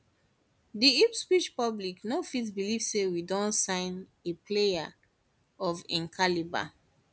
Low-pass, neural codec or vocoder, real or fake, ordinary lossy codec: none; none; real; none